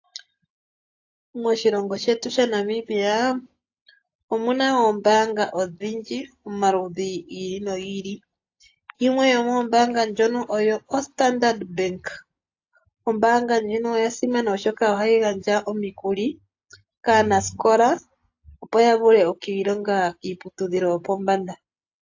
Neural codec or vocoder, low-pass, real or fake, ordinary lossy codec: none; 7.2 kHz; real; AAC, 48 kbps